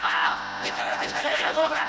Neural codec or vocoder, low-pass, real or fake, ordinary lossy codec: codec, 16 kHz, 0.5 kbps, FreqCodec, smaller model; none; fake; none